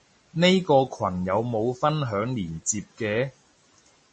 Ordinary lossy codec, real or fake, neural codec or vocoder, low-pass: MP3, 32 kbps; real; none; 10.8 kHz